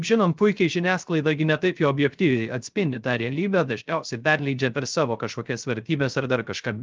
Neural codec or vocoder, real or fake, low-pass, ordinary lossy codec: codec, 16 kHz, 0.3 kbps, FocalCodec; fake; 7.2 kHz; Opus, 24 kbps